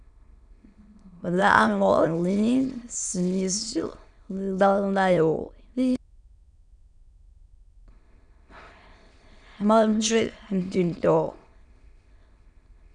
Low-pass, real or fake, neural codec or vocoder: 9.9 kHz; fake; autoencoder, 22.05 kHz, a latent of 192 numbers a frame, VITS, trained on many speakers